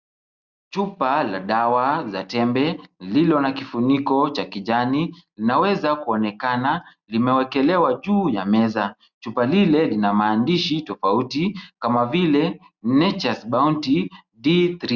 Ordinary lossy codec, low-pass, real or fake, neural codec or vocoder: Opus, 64 kbps; 7.2 kHz; real; none